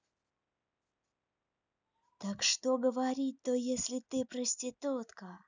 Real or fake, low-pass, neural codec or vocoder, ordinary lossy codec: real; 7.2 kHz; none; none